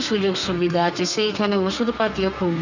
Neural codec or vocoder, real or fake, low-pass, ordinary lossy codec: codec, 32 kHz, 1.9 kbps, SNAC; fake; 7.2 kHz; none